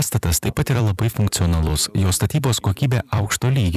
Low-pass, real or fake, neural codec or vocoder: 14.4 kHz; fake; vocoder, 44.1 kHz, 128 mel bands every 256 samples, BigVGAN v2